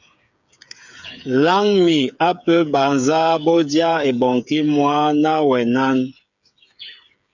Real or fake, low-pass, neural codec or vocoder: fake; 7.2 kHz; codec, 16 kHz, 8 kbps, FreqCodec, smaller model